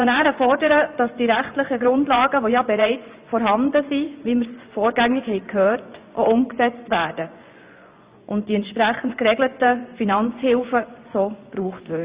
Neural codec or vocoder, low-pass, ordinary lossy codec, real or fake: vocoder, 24 kHz, 100 mel bands, Vocos; 3.6 kHz; Opus, 64 kbps; fake